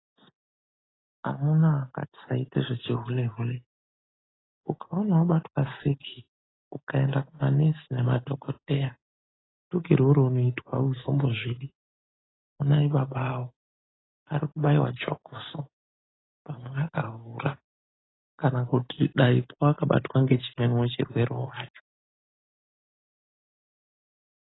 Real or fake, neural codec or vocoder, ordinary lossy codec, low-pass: real; none; AAC, 16 kbps; 7.2 kHz